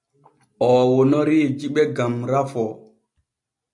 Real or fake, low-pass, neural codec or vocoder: real; 10.8 kHz; none